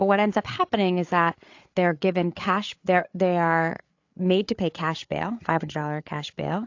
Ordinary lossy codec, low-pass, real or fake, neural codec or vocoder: AAC, 48 kbps; 7.2 kHz; fake; codec, 16 kHz, 8 kbps, FreqCodec, larger model